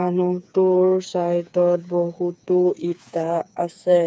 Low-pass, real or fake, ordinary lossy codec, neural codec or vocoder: none; fake; none; codec, 16 kHz, 4 kbps, FreqCodec, smaller model